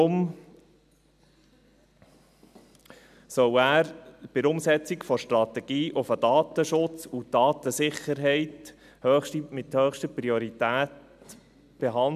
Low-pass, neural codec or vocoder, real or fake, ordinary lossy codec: 14.4 kHz; none; real; none